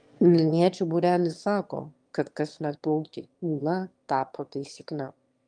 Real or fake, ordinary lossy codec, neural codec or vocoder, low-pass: fake; Opus, 32 kbps; autoencoder, 22.05 kHz, a latent of 192 numbers a frame, VITS, trained on one speaker; 9.9 kHz